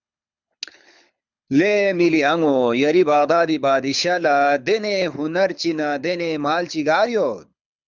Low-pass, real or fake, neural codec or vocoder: 7.2 kHz; fake; codec, 24 kHz, 6 kbps, HILCodec